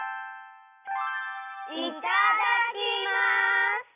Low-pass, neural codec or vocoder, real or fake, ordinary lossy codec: 3.6 kHz; none; real; none